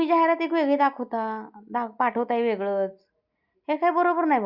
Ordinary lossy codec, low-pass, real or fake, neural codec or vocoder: Opus, 64 kbps; 5.4 kHz; real; none